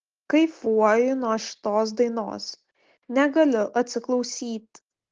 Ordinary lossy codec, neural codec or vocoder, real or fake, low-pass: Opus, 16 kbps; none; real; 7.2 kHz